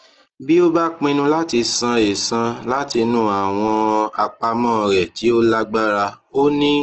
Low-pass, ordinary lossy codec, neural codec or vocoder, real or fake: 7.2 kHz; Opus, 16 kbps; none; real